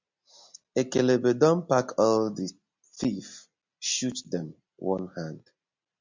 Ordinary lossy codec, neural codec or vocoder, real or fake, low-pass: MP3, 64 kbps; none; real; 7.2 kHz